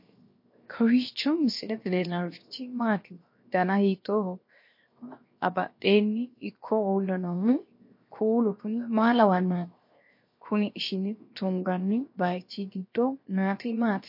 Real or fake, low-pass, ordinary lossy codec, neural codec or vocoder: fake; 5.4 kHz; MP3, 32 kbps; codec, 16 kHz, 0.7 kbps, FocalCodec